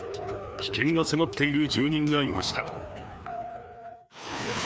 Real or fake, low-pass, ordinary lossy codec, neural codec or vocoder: fake; none; none; codec, 16 kHz, 2 kbps, FreqCodec, larger model